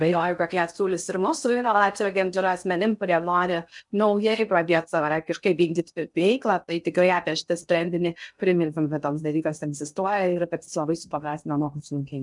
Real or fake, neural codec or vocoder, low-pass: fake; codec, 16 kHz in and 24 kHz out, 0.6 kbps, FocalCodec, streaming, 2048 codes; 10.8 kHz